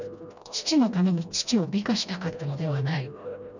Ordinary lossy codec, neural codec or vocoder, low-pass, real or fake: none; codec, 16 kHz, 1 kbps, FreqCodec, smaller model; 7.2 kHz; fake